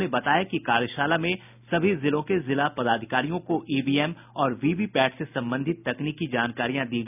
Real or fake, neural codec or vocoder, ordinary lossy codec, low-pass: real; none; none; 3.6 kHz